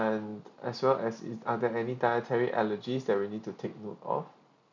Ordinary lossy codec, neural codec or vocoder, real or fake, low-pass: none; none; real; 7.2 kHz